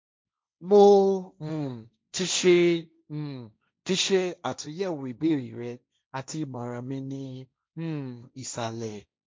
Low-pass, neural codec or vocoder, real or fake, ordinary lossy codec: none; codec, 16 kHz, 1.1 kbps, Voila-Tokenizer; fake; none